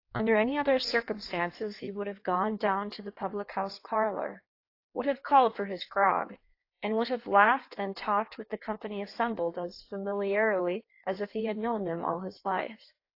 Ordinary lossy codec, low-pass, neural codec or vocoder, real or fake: AAC, 32 kbps; 5.4 kHz; codec, 16 kHz in and 24 kHz out, 1.1 kbps, FireRedTTS-2 codec; fake